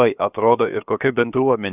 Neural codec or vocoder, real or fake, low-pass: codec, 16 kHz, 0.7 kbps, FocalCodec; fake; 3.6 kHz